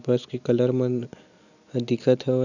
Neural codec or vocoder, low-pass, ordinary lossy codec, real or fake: none; 7.2 kHz; none; real